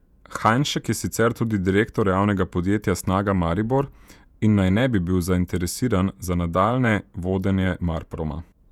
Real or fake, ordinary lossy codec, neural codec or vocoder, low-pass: fake; none; vocoder, 48 kHz, 128 mel bands, Vocos; 19.8 kHz